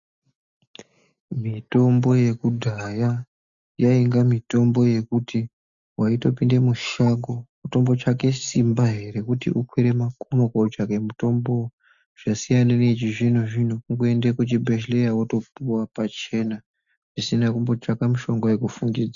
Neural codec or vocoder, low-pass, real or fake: none; 7.2 kHz; real